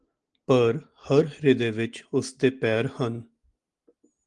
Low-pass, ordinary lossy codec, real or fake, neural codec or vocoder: 10.8 kHz; Opus, 24 kbps; real; none